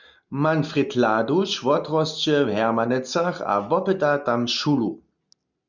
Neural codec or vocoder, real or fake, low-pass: none; real; 7.2 kHz